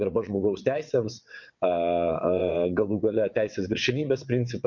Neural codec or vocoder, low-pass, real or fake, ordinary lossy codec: vocoder, 22.05 kHz, 80 mel bands, WaveNeXt; 7.2 kHz; fake; MP3, 48 kbps